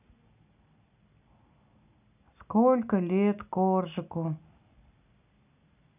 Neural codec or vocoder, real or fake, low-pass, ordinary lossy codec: none; real; 3.6 kHz; none